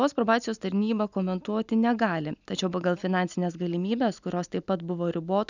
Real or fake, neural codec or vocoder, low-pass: real; none; 7.2 kHz